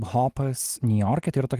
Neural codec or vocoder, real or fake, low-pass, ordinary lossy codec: none; real; 14.4 kHz; Opus, 24 kbps